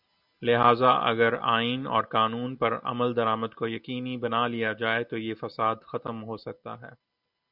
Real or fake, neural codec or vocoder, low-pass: real; none; 5.4 kHz